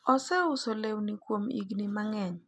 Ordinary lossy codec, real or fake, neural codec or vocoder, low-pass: none; real; none; none